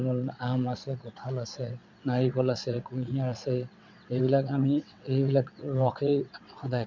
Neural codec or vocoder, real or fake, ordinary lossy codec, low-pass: vocoder, 22.05 kHz, 80 mel bands, WaveNeXt; fake; none; 7.2 kHz